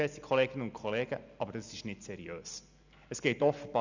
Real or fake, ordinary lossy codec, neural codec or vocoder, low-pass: real; none; none; 7.2 kHz